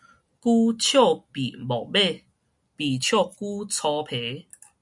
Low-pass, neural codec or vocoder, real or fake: 10.8 kHz; none; real